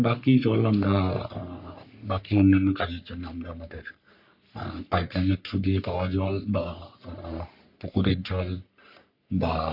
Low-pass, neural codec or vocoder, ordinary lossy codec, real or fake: 5.4 kHz; codec, 44.1 kHz, 3.4 kbps, Pupu-Codec; AAC, 48 kbps; fake